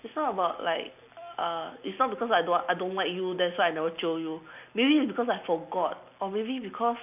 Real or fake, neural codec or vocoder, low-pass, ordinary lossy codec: real; none; 3.6 kHz; none